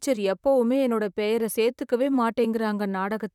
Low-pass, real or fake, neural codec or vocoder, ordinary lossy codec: 19.8 kHz; fake; vocoder, 44.1 kHz, 128 mel bands, Pupu-Vocoder; none